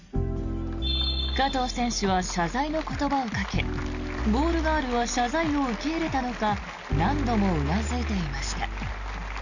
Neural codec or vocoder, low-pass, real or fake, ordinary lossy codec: none; 7.2 kHz; real; none